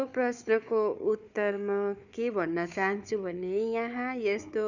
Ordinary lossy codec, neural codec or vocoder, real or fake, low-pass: none; codec, 16 kHz, 4 kbps, FunCodec, trained on Chinese and English, 50 frames a second; fake; 7.2 kHz